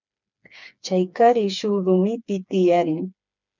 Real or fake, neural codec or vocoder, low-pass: fake; codec, 16 kHz, 4 kbps, FreqCodec, smaller model; 7.2 kHz